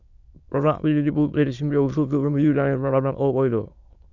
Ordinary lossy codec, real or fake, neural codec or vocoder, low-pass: Opus, 64 kbps; fake; autoencoder, 22.05 kHz, a latent of 192 numbers a frame, VITS, trained on many speakers; 7.2 kHz